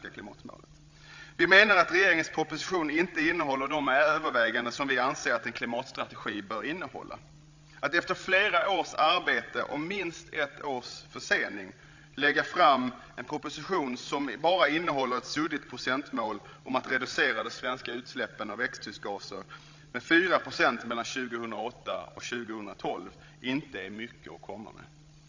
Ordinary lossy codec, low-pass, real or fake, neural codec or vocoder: AAC, 48 kbps; 7.2 kHz; fake; codec, 16 kHz, 16 kbps, FreqCodec, larger model